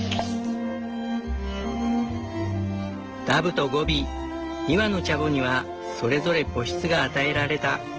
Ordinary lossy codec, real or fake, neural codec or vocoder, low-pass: Opus, 16 kbps; real; none; 7.2 kHz